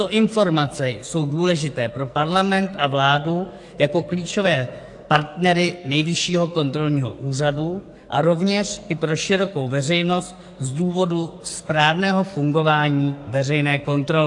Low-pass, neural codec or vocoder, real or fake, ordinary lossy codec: 10.8 kHz; codec, 44.1 kHz, 2.6 kbps, SNAC; fake; AAC, 64 kbps